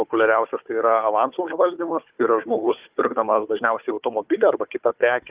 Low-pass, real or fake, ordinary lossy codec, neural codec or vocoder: 3.6 kHz; fake; Opus, 32 kbps; codec, 16 kHz, 4 kbps, FunCodec, trained on Chinese and English, 50 frames a second